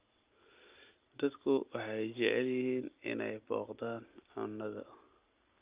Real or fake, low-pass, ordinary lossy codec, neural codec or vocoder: real; 3.6 kHz; Opus, 24 kbps; none